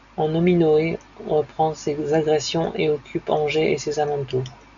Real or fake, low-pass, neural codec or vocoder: real; 7.2 kHz; none